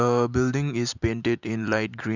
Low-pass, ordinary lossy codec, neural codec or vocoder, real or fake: 7.2 kHz; none; vocoder, 44.1 kHz, 128 mel bands every 512 samples, BigVGAN v2; fake